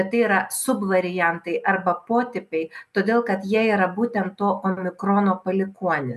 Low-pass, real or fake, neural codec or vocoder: 14.4 kHz; real; none